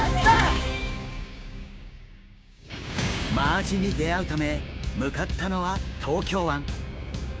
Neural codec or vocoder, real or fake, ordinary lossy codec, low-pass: codec, 16 kHz, 6 kbps, DAC; fake; none; none